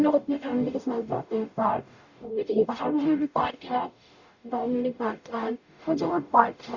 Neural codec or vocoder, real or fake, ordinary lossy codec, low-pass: codec, 44.1 kHz, 0.9 kbps, DAC; fake; none; 7.2 kHz